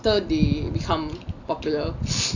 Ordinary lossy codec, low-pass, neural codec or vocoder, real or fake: none; 7.2 kHz; none; real